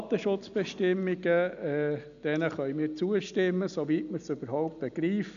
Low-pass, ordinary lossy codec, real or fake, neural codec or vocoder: 7.2 kHz; MP3, 96 kbps; real; none